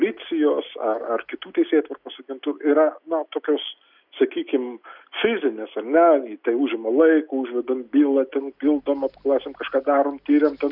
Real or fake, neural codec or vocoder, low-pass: real; none; 5.4 kHz